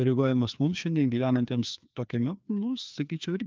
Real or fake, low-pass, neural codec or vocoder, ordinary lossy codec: fake; 7.2 kHz; codec, 16 kHz, 2 kbps, FreqCodec, larger model; Opus, 24 kbps